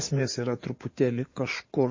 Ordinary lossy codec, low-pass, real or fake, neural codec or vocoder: MP3, 32 kbps; 7.2 kHz; fake; codec, 16 kHz in and 24 kHz out, 2.2 kbps, FireRedTTS-2 codec